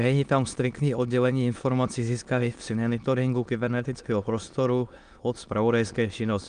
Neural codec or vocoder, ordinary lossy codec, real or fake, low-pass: autoencoder, 22.05 kHz, a latent of 192 numbers a frame, VITS, trained on many speakers; Opus, 32 kbps; fake; 9.9 kHz